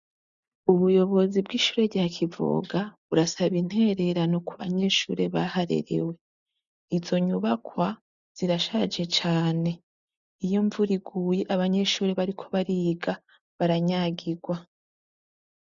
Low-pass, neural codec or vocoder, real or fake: 7.2 kHz; none; real